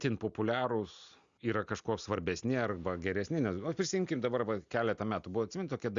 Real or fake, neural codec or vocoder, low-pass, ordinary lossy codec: real; none; 7.2 kHz; Opus, 64 kbps